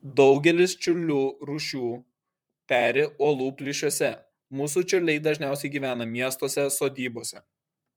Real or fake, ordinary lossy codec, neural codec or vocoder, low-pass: fake; MP3, 96 kbps; vocoder, 44.1 kHz, 128 mel bands, Pupu-Vocoder; 19.8 kHz